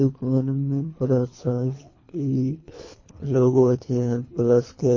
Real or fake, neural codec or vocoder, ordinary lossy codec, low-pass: fake; codec, 24 kHz, 3 kbps, HILCodec; MP3, 32 kbps; 7.2 kHz